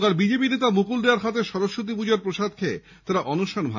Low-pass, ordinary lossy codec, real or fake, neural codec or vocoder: 7.2 kHz; none; real; none